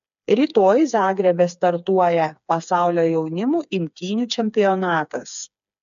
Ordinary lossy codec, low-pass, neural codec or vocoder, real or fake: AAC, 96 kbps; 7.2 kHz; codec, 16 kHz, 4 kbps, FreqCodec, smaller model; fake